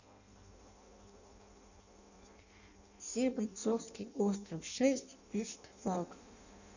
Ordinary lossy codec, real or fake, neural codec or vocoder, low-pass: none; fake; codec, 16 kHz in and 24 kHz out, 0.6 kbps, FireRedTTS-2 codec; 7.2 kHz